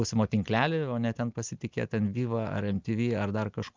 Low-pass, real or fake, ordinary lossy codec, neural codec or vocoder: 7.2 kHz; real; Opus, 32 kbps; none